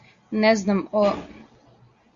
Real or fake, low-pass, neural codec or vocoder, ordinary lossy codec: real; 7.2 kHz; none; Opus, 64 kbps